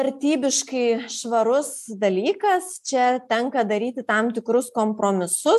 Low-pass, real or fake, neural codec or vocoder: 14.4 kHz; real; none